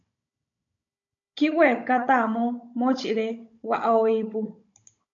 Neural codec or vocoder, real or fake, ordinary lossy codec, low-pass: codec, 16 kHz, 16 kbps, FunCodec, trained on Chinese and English, 50 frames a second; fake; MP3, 64 kbps; 7.2 kHz